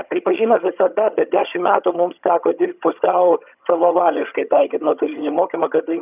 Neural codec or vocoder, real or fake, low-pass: vocoder, 22.05 kHz, 80 mel bands, HiFi-GAN; fake; 3.6 kHz